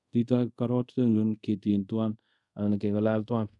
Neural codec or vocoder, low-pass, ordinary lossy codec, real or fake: codec, 24 kHz, 0.5 kbps, DualCodec; 10.8 kHz; none; fake